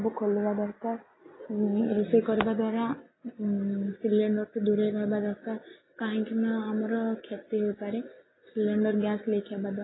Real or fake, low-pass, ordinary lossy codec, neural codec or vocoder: real; 7.2 kHz; AAC, 16 kbps; none